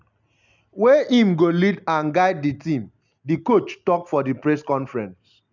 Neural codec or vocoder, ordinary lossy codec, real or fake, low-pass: none; none; real; 7.2 kHz